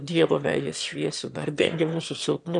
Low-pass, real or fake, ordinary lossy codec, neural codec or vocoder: 9.9 kHz; fake; MP3, 96 kbps; autoencoder, 22.05 kHz, a latent of 192 numbers a frame, VITS, trained on one speaker